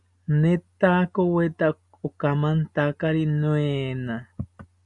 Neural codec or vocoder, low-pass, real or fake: none; 10.8 kHz; real